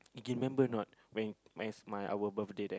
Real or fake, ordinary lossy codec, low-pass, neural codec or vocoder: real; none; none; none